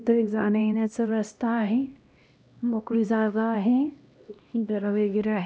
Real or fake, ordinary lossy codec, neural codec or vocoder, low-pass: fake; none; codec, 16 kHz, 1 kbps, X-Codec, HuBERT features, trained on LibriSpeech; none